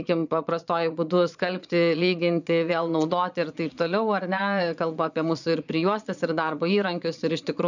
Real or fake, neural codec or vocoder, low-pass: fake; vocoder, 22.05 kHz, 80 mel bands, Vocos; 7.2 kHz